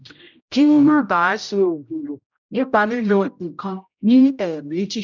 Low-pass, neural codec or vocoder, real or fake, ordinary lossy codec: 7.2 kHz; codec, 16 kHz, 0.5 kbps, X-Codec, HuBERT features, trained on general audio; fake; none